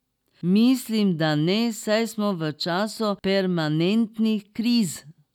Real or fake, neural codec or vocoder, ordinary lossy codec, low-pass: real; none; none; 19.8 kHz